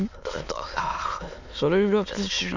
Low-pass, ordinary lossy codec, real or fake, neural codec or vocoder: 7.2 kHz; none; fake; autoencoder, 22.05 kHz, a latent of 192 numbers a frame, VITS, trained on many speakers